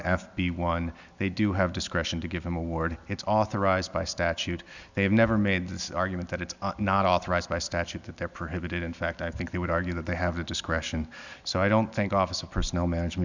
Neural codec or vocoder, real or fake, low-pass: none; real; 7.2 kHz